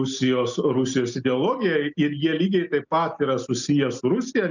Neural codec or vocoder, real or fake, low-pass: none; real; 7.2 kHz